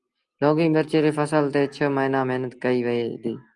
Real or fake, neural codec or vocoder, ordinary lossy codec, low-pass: real; none; Opus, 32 kbps; 10.8 kHz